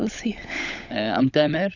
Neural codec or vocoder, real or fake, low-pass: codec, 16 kHz, 16 kbps, FunCodec, trained on LibriTTS, 50 frames a second; fake; 7.2 kHz